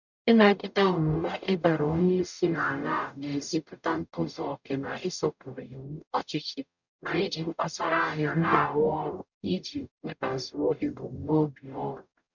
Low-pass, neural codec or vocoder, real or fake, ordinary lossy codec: 7.2 kHz; codec, 44.1 kHz, 0.9 kbps, DAC; fake; none